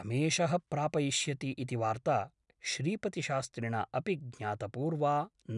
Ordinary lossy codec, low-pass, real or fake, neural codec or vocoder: none; 10.8 kHz; real; none